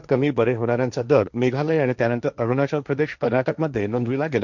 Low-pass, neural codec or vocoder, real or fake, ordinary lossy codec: 7.2 kHz; codec, 16 kHz, 1.1 kbps, Voila-Tokenizer; fake; none